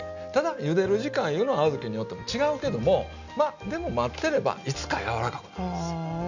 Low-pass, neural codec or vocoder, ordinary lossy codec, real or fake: 7.2 kHz; none; none; real